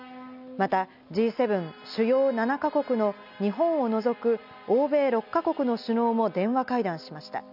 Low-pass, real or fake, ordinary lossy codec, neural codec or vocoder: 5.4 kHz; real; none; none